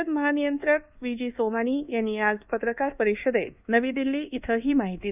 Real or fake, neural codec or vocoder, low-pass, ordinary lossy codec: fake; codec, 24 kHz, 1.2 kbps, DualCodec; 3.6 kHz; none